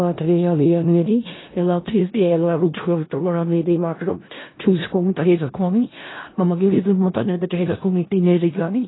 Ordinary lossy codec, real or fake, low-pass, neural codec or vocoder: AAC, 16 kbps; fake; 7.2 kHz; codec, 16 kHz in and 24 kHz out, 0.4 kbps, LongCat-Audio-Codec, four codebook decoder